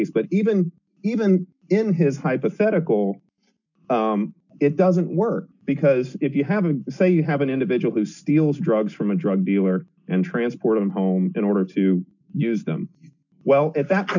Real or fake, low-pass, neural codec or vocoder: real; 7.2 kHz; none